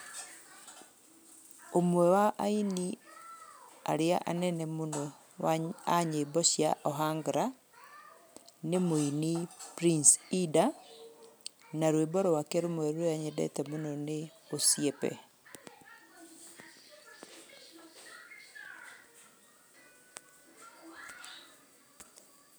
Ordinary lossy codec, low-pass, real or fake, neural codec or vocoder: none; none; real; none